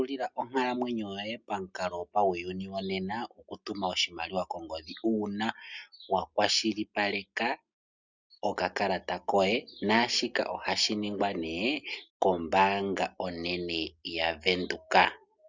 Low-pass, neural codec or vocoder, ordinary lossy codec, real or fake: 7.2 kHz; none; Opus, 64 kbps; real